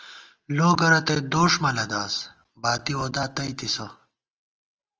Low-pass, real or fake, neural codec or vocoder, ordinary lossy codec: 7.2 kHz; real; none; Opus, 24 kbps